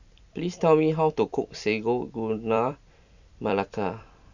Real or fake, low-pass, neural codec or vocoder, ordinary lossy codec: fake; 7.2 kHz; vocoder, 44.1 kHz, 128 mel bands every 256 samples, BigVGAN v2; none